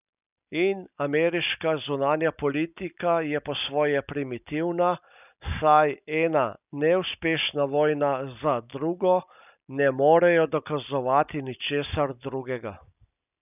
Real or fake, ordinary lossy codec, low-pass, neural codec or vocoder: real; none; 3.6 kHz; none